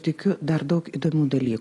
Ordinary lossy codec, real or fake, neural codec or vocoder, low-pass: AAC, 32 kbps; real; none; 10.8 kHz